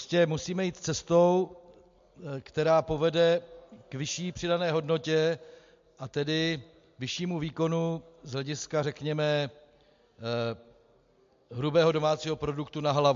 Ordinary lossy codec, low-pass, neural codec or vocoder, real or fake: MP3, 48 kbps; 7.2 kHz; none; real